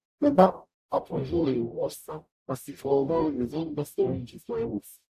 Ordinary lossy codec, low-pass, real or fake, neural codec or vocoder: AAC, 96 kbps; 14.4 kHz; fake; codec, 44.1 kHz, 0.9 kbps, DAC